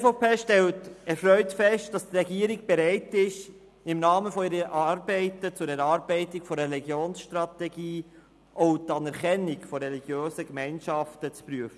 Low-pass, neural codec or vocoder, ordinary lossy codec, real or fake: none; none; none; real